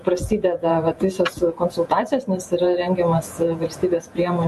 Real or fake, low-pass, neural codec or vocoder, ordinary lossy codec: fake; 14.4 kHz; vocoder, 48 kHz, 128 mel bands, Vocos; MP3, 64 kbps